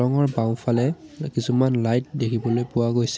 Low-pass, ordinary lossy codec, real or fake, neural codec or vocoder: none; none; real; none